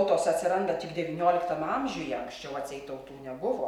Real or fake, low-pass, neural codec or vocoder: real; 19.8 kHz; none